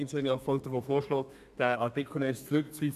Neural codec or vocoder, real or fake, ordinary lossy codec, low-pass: codec, 32 kHz, 1.9 kbps, SNAC; fake; none; 14.4 kHz